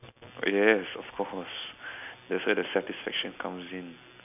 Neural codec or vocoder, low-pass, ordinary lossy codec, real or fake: none; 3.6 kHz; none; real